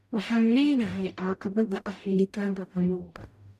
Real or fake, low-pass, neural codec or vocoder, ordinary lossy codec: fake; 14.4 kHz; codec, 44.1 kHz, 0.9 kbps, DAC; none